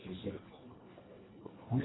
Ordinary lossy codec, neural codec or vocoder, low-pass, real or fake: AAC, 16 kbps; codec, 24 kHz, 1.5 kbps, HILCodec; 7.2 kHz; fake